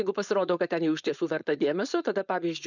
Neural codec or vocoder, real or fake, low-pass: codec, 16 kHz, 4.8 kbps, FACodec; fake; 7.2 kHz